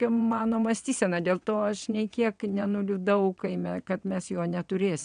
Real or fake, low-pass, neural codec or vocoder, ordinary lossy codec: fake; 9.9 kHz; vocoder, 22.05 kHz, 80 mel bands, WaveNeXt; MP3, 96 kbps